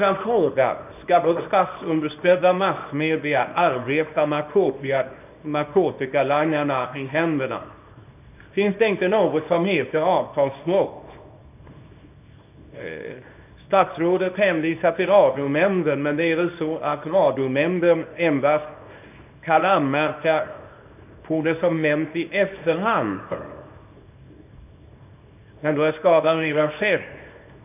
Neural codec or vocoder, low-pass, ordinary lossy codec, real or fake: codec, 24 kHz, 0.9 kbps, WavTokenizer, small release; 3.6 kHz; none; fake